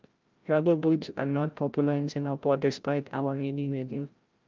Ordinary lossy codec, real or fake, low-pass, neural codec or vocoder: Opus, 24 kbps; fake; 7.2 kHz; codec, 16 kHz, 0.5 kbps, FreqCodec, larger model